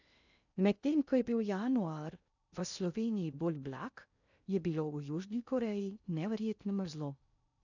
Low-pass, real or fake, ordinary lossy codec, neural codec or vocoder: 7.2 kHz; fake; none; codec, 16 kHz in and 24 kHz out, 0.6 kbps, FocalCodec, streaming, 2048 codes